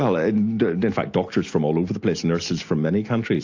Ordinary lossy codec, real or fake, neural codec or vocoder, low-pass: AAC, 48 kbps; real; none; 7.2 kHz